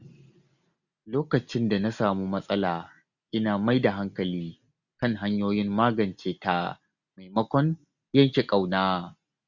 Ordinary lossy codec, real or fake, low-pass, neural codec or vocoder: none; real; 7.2 kHz; none